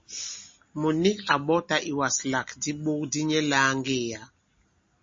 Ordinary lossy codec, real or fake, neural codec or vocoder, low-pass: MP3, 32 kbps; real; none; 7.2 kHz